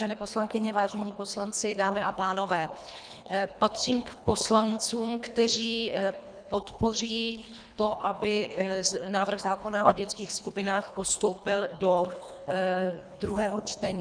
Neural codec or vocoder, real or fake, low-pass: codec, 24 kHz, 1.5 kbps, HILCodec; fake; 9.9 kHz